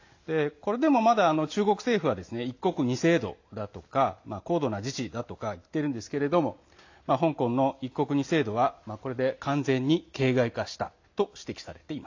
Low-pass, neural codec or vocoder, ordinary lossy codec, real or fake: 7.2 kHz; none; AAC, 48 kbps; real